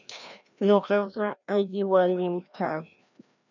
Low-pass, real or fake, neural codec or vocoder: 7.2 kHz; fake; codec, 16 kHz, 1 kbps, FreqCodec, larger model